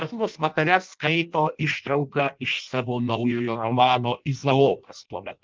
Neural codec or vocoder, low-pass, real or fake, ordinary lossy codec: codec, 16 kHz in and 24 kHz out, 0.6 kbps, FireRedTTS-2 codec; 7.2 kHz; fake; Opus, 24 kbps